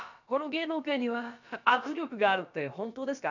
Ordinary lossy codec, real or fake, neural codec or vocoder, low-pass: none; fake; codec, 16 kHz, about 1 kbps, DyCAST, with the encoder's durations; 7.2 kHz